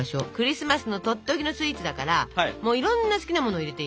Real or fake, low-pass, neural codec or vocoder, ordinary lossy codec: real; none; none; none